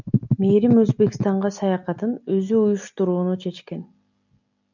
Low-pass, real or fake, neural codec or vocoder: 7.2 kHz; real; none